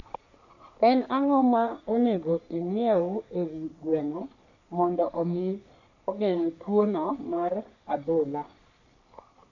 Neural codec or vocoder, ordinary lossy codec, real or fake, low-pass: codec, 44.1 kHz, 3.4 kbps, Pupu-Codec; none; fake; 7.2 kHz